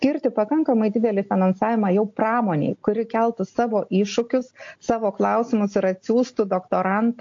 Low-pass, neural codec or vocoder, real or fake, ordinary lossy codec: 7.2 kHz; none; real; AAC, 48 kbps